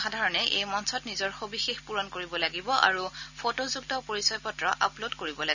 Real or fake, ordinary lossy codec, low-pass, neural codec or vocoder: real; none; 7.2 kHz; none